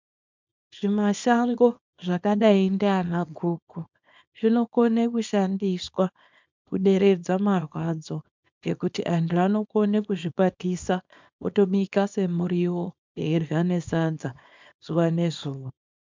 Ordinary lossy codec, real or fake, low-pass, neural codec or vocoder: MP3, 64 kbps; fake; 7.2 kHz; codec, 24 kHz, 0.9 kbps, WavTokenizer, small release